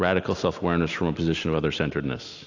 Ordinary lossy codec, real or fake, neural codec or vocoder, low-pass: AAC, 48 kbps; real; none; 7.2 kHz